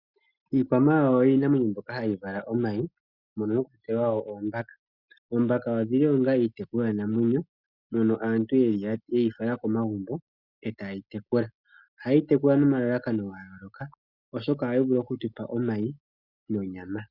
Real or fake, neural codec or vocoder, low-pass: real; none; 5.4 kHz